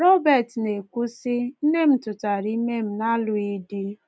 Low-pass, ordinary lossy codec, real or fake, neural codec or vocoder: none; none; real; none